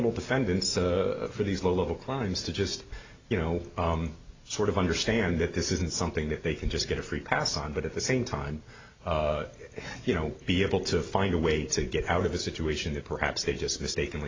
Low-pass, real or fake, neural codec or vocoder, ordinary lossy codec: 7.2 kHz; real; none; AAC, 32 kbps